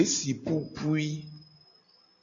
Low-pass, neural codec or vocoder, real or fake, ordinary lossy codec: 7.2 kHz; none; real; MP3, 96 kbps